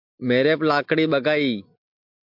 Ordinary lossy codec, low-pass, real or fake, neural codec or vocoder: MP3, 48 kbps; 5.4 kHz; real; none